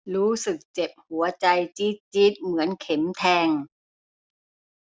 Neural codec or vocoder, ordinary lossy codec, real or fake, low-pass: none; none; real; none